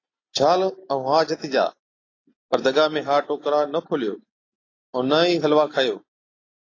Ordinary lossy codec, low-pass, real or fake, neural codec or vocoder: AAC, 32 kbps; 7.2 kHz; real; none